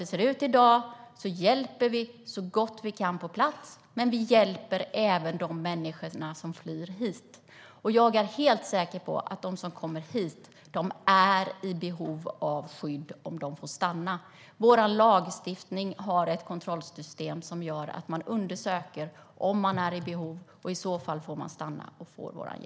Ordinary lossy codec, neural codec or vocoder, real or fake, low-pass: none; none; real; none